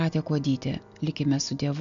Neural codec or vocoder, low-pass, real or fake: none; 7.2 kHz; real